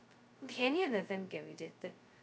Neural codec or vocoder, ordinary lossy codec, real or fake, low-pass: codec, 16 kHz, 0.2 kbps, FocalCodec; none; fake; none